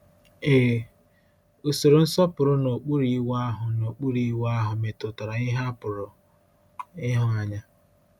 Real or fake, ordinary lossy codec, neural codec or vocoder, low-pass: real; none; none; 19.8 kHz